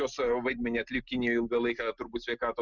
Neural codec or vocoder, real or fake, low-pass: none; real; 7.2 kHz